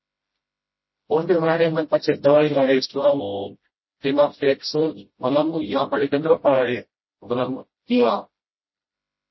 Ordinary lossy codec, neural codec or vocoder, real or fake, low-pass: MP3, 24 kbps; codec, 16 kHz, 0.5 kbps, FreqCodec, smaller model; fake; 7.2 kHz